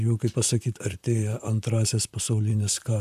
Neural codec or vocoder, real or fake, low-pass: vocoder, 44.1 kHz, 128 mel bands, Pupu-Vocoder; fake; 14.4 kHz